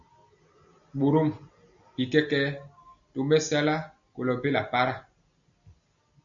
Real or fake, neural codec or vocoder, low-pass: real; none; 7.2 kHz